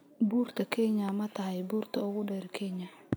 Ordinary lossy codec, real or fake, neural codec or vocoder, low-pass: none; real; none; none